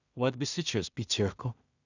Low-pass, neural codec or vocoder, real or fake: 7.2 kHz; codec, 16 kHz in and 24 kHz out, 0.4 kbps, LongCat-Audio-Codec, two codebook decoder; fake